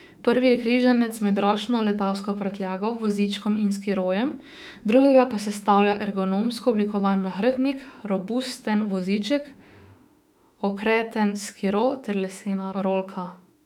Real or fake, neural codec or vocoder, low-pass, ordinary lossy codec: fake; autoencoder, 48 kHz, 32 numbers a frame, DAC-VAE, trained on Japanese speech; 19.8 kHz; none